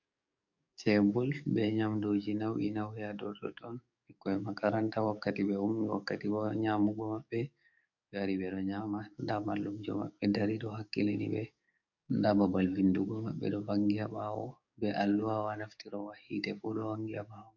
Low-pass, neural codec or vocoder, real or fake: 7.2 kHz; codec, 44.1 kHz, 7.8 kbps, DAC; fake